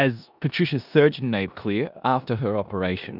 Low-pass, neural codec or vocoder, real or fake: 5.4 kHz; codec, 16 kHz in and 24 kHz out, 0.9 kbps, LongCat-Audio-Codec, four codebook decoder; fake